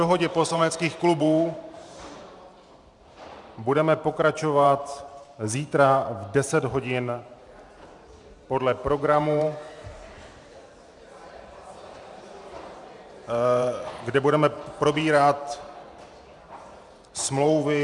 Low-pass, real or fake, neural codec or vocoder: 10.8 kHz; fake; vocoder, 44.1 kHz, 128 mel bands every 512 samples, BigVGAN v2